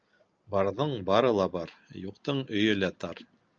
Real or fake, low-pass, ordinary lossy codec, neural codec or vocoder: real; 7.2 kHz; Opus, 24 kbps; none